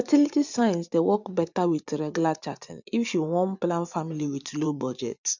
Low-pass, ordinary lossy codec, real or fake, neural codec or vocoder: 7.2 kHz; none; fake; vocoder, 24 kHz, 100 mel bands, Vocos